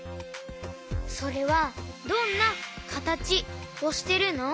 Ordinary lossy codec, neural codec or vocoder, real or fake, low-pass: none; none; real; none